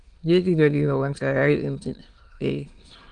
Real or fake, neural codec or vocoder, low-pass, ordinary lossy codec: fake; autoencoder, 22.05 kHz, a latent of 192 numbers a frame, VITS, trained on many speakers; 9.9 kHz; Opus, 32 kbps